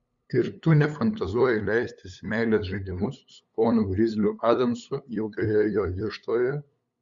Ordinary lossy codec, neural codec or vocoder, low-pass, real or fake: Opus, 64 kbps; codec, 16 kHz, 8 kbps, FunCodec, trained on LibriTTS, 25 frames a second; 7.2 kHz; fake